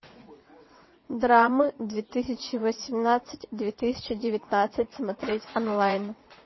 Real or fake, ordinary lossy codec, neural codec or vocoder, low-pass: fake; MP3, 24 kbps; vocoder, 22.05 kHz, 80 mel bands, WaveNeXt; 7.2 kHz